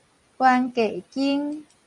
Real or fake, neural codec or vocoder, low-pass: real; none; 10.8 kHz